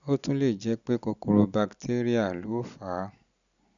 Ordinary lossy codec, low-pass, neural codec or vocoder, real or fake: none; 7.2 kHz; none; real